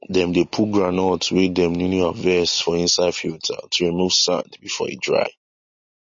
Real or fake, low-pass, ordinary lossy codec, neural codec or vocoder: real; 7.2 kHz; MP3, 32 kbps; none